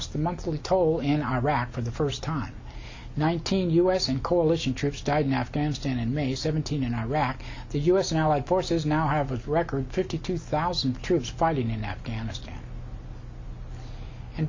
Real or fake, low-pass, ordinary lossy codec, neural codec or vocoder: real; 7.2 kHz; MP3, 64 kbps; none